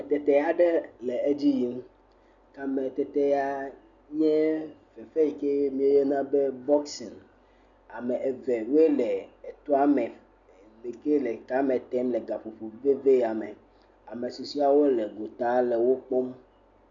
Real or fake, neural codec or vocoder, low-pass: real; none; 7.2 kHz